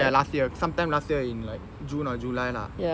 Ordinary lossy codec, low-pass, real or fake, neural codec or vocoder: none; none; real; none